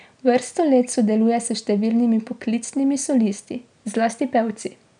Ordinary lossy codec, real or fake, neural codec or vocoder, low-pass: none; real; none; 9.9 kHz